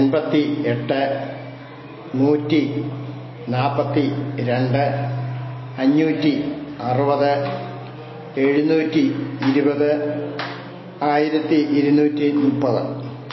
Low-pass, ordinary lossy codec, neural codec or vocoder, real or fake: 7.2 kHz; MP3, 24 kbps; none; real